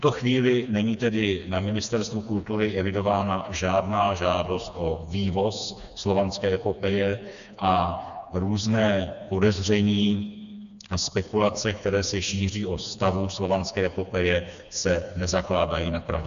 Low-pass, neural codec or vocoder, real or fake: 7.2 kHz; codec, 16 kHz, 2 kbps, FreqCodec, smaller model; fake